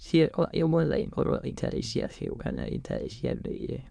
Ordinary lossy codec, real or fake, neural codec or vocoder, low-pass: none; fake; autoencoder, 22.05 kHz, a latent of 192 numbers a frame, VITS, trained on many speakers; none